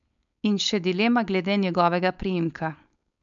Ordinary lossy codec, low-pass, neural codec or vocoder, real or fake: none; 7.2 kHz; codec, 16 kHz, 4.8 kbps, FACodec; fake